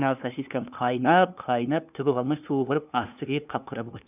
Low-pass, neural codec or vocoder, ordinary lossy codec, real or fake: 3.6 kHz; codec, 16 kHz, 2 kbps, FunCodec, trained on LibriTTS, 25 frames a second; none; fake